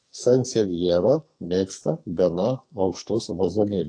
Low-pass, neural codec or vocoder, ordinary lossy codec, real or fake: 9.9 kHz; codec, 44.1 kHz, 2.6 kbps, SNAC; AAC, 48 kbps; fake